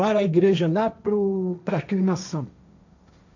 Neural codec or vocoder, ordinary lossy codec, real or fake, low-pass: codec, 16 kHz, 1.1 kbps, Voila-Tokenizer; none; fake; 7.2 kHz